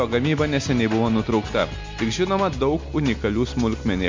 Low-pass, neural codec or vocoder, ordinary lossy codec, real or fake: 7.2 kHz; none; MP3, 48 kbps; real